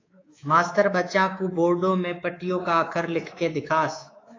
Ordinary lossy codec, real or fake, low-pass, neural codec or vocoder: AAC, 32 kbps; fake; 7.2 kHz; codec, 24 kHz, 3.1 kbps, DualCodec